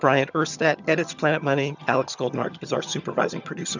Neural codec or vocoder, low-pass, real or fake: vocoder, 22.05 kHz, 80 mel bands, HiFi-GAN; 7.2 kHz; fake